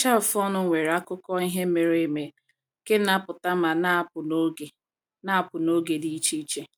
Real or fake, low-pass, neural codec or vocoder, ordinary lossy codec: real; none; none; none